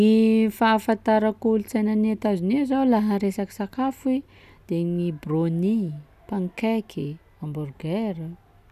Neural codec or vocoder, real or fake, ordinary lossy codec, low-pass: none; real; none; 14.4 kHz